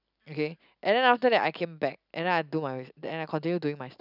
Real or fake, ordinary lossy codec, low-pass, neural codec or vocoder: real; none; 5.4 kHz; none